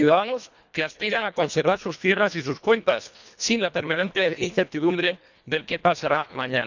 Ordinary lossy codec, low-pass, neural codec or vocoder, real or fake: none; 7.2 kHz; codec, 24 kHz, 1.5 kbps, HILCodec; fake